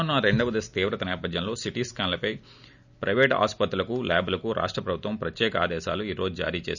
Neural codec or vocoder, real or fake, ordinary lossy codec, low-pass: none; real; none; 7.2 kHz